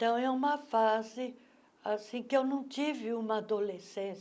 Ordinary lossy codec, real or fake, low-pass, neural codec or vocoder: none; real; none; none